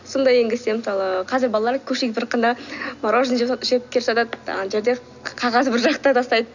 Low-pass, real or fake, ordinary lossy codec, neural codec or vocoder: 7.2 kHz; real; none; none